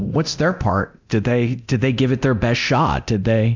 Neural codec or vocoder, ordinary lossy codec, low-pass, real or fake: codec, 24 kHz, 0.9 kbps, DualCodec; MP3, 64 kbps; 7.2 kHz; fake